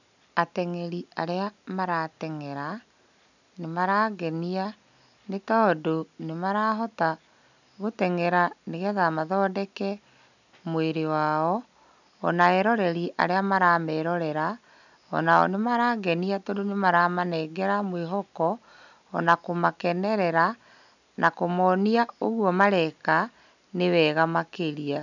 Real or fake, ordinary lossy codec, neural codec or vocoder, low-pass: real; none; none; 7.2 kHz